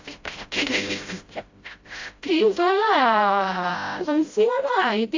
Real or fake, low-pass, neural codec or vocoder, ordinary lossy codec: fake; 7.2 kHz; codec, 16 kHz, 0.5 kbps, FreqCodec, smaller model; AAC, 48 kbps